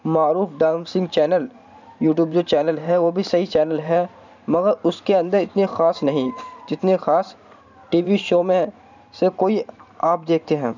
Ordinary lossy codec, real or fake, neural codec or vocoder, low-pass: none; fake; vocoder, 44.1 kHz, 128 mel bands every 512 samples, BigVGAN v2; 7.2 kHz